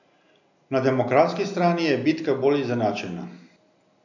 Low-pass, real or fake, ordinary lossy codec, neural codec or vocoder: 7.2 kHz; real; none; none